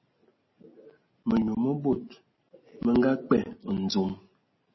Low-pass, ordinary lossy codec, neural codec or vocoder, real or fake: 7.2 kHz; MP3, 24 kbps; none; real